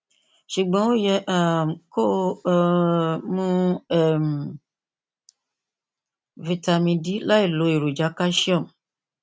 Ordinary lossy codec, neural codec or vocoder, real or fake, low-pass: none; none; real; none